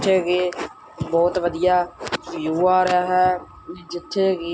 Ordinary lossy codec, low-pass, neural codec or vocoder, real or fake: none; none; none; real